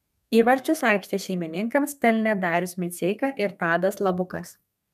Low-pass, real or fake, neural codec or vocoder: 14.4 kHz; fake; codec, 32 kHz, 1.9 kbps, SNAC